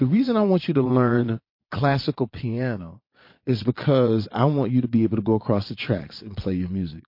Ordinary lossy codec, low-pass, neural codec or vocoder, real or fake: MP3, 32 kbps; 5.4 kHz; vocoder, 22.05 kHz, 80 mel bands, Vocos; fake